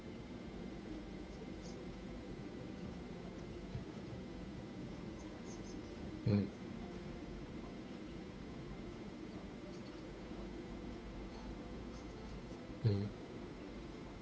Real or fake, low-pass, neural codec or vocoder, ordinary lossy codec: fake; none; codec, 16 kHz, 8 kbps, FunCodec, trained on Chinese and English, 25 frames a second; none